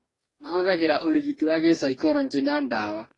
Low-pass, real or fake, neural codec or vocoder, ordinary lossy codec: 10.8 kHz; fake; codec, 44.1 kHz, 2.6 kbps, DAC; AAC, 32 kbps